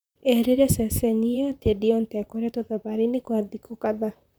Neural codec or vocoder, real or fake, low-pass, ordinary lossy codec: vocoder, 44.1 kHz, 128 mel bands, Pupu-Vocoder; fake; none; none